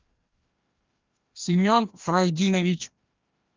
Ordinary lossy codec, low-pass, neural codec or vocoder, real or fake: Opus, 24 kbps; 7.2 kHz; codec, 16 kHz, 1 kbps, FreqCodec, larger model; fake